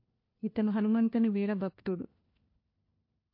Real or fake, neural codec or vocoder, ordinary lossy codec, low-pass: fake; codec, 16 kHz, 1 kbps, FunCodec, trained on LibriTTS, 50 frames a second; MP3, 32 kbps; 5.4 kHz